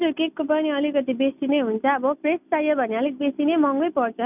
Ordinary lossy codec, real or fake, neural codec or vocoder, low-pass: none; real; none; 3.6 kHz